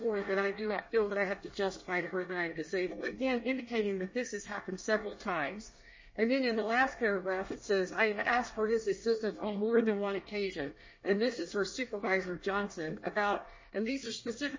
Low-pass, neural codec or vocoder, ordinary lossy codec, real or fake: 7.2 kHz; codec, 24 kHz, 1 kbps, SNAC; MP3, 32 kbps; fake